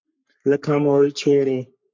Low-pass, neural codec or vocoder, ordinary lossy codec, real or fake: 7.2 kHz; codec, 44.1 kHz, 3.4 kbps, Pupu-Codec; MP3, 64 kbps; fake